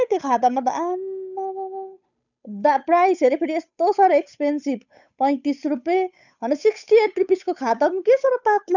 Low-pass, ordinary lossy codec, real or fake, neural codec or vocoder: 7.2 kHz; none; fake; codec, 16 kHz, 8 kbps, FunCodec, trained on Chinese and English, 25 frames a second